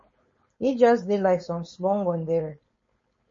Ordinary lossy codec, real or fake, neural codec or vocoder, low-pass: MP3, 32 kbps; fake; codec, 16 kHz, 4.8 kbps, FACodec; 7.2 kHz